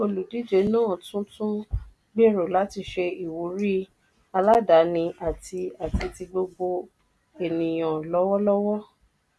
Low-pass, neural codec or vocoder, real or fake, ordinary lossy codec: none; none; real; none